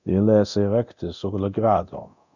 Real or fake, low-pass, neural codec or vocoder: fake; 7.2 kHz; codec, 24 kHz, 0.9 kbps, DualCodec